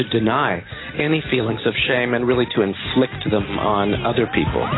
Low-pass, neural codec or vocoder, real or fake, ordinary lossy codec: 7.2 kHz; none; real; AAC, 16 kbps